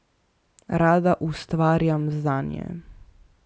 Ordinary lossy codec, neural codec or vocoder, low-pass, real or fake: none; none; none; real